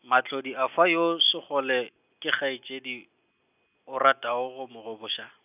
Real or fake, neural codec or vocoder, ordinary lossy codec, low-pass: real; none; none; 3.6 kHz